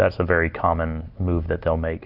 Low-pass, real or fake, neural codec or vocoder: 5.4 kHz; real; none